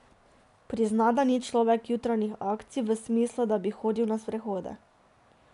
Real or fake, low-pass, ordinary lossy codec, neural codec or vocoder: real; 10.8 kHz; MP3, 96 kbps; none